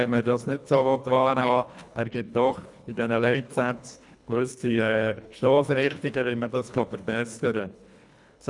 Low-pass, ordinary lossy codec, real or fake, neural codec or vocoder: 10.8 kHz; none; fake; codec, 24 kHz, 1.5 kbps, HILCodec